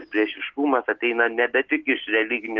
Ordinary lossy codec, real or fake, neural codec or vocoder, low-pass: Opus, 16 kbps; real; none; 7.2 kHz